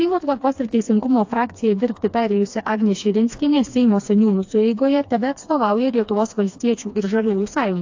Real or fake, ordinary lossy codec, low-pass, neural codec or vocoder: fake; AAC, 48 kbps; 7.2 kHz; codec, 16 kHz, 2 kbps, FreqCodec, smaller model